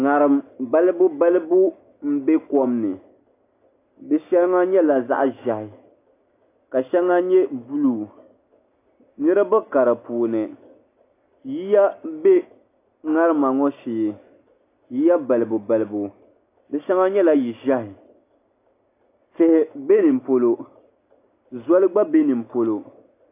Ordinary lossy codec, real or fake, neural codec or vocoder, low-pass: AAC, 24 kbps; real; none; 3.6 kHz